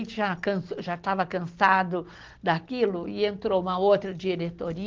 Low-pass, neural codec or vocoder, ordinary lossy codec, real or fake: 7.2 kHz; none; Opus, 16 kbps; real